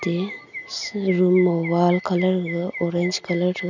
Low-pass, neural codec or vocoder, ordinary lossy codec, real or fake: 7.2 kHz; none; none; real